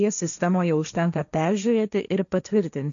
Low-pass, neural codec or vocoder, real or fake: 7.2 kHz; codec, 16 kHz, 1.1 kbps, Voila-Tokenizer; fake